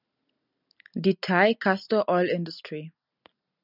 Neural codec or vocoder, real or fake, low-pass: none; real; 5.4 kHz